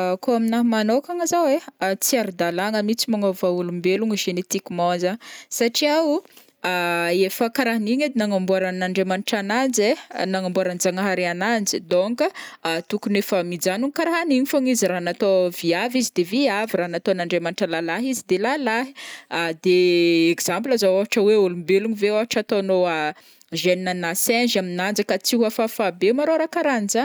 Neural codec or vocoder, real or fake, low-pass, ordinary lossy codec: none; real; none; none